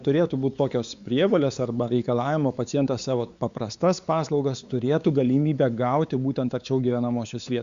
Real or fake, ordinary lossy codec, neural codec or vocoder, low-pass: fake; Opus, 64 kbps; codec, 16 kHz, 4 kbps, X-Codec, WavLM features, trained on Multilingual LibriSpeech; 7.2 kHz